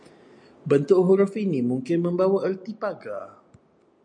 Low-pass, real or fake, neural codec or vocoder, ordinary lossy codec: 9.9 kHz; real; none; MP3, 64 kbps